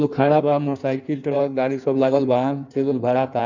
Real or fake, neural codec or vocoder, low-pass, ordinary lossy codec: fake; codec, 16 kHz in and 24 kHz out, 1.1 kbps, FireRedTTS-2 codec; 7.2 kHz; none